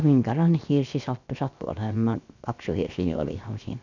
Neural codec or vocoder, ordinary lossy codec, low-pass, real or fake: codec, 16 kHz, 0.7 kbps, FocalCodec; none; 7.2 kHz; fake